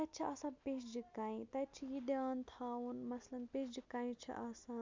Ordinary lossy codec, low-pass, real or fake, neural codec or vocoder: MP3, 64 kbps; 7.2 kHz; real; none